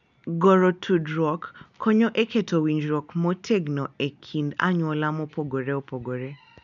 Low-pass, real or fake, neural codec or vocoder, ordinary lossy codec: 7.2 kHz; real; none; none